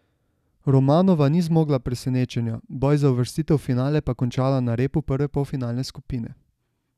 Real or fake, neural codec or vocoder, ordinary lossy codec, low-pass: real; none; none; 14.4 kHz